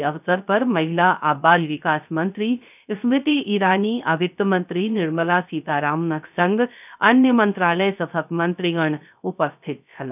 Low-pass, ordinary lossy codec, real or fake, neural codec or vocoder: 3.6 kHz; none; fake; codec, 16 kHz, 0.3 kbps, FocalCodec